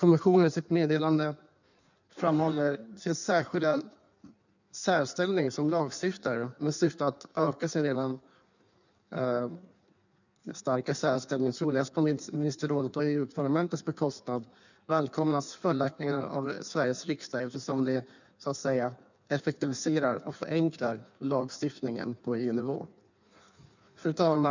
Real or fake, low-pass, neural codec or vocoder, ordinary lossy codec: fake; 7.2 kHz; codec, 16 kHz in and 24 kHz out, 1.1 kbps, FireRedTTS-2 codec; none